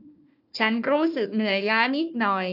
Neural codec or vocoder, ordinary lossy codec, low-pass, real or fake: codec, 16 kHz, 1 kbps, FunCodec, trained on Chinese and English, 50 frames a second; none; 5.4 kHz; fake